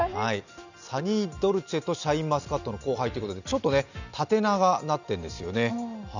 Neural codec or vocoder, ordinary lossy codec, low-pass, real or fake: none; none; 7.2 kHz; real